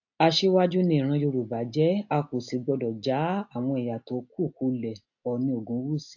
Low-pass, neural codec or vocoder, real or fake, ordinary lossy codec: 7.2 kHz; none; real; AAC, 48 kbps